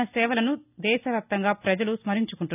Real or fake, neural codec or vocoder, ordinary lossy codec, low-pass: real; none; none; 3.6 kHz